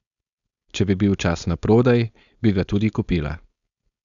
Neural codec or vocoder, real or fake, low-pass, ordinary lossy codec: codec, 16 kHz, 4.8 kbps, FACodec; fake; 7.2 kHz; none